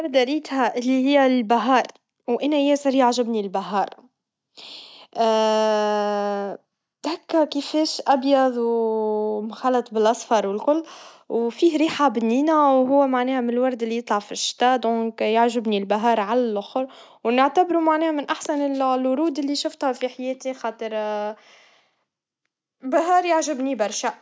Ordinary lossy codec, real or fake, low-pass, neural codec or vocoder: none; real; none; none